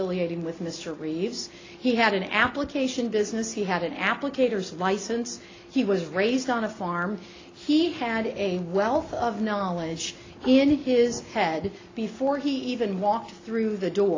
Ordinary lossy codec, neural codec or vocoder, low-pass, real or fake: AAC, 32 kbps; none; 7.2 kHz; real